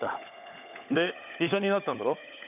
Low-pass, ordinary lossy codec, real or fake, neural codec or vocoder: 3.6 kHz; none; fake; codec, 16 kHz, 16 kbps, FunCodec, trained on LibriTTS, 50 frames a second